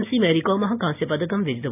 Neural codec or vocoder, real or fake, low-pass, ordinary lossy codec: none; real; 3.6 kHz; none